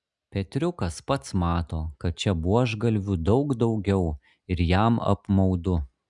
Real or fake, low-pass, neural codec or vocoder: real; 10.8 kHz; none